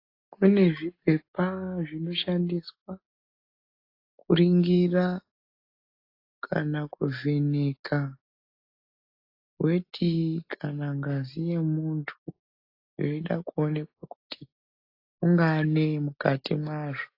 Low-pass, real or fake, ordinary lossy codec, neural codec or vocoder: 5.4 kHz; real; AAC, 32 kbps; none